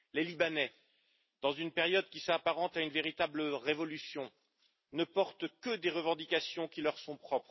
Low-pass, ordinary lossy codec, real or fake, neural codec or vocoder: 7.2 kHz; MP3, 24 kbps; real; none